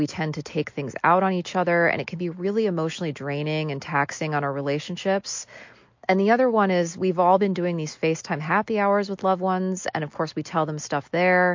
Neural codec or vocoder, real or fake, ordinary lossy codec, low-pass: none; real; MP3, 48 kbps; 7.2 kHz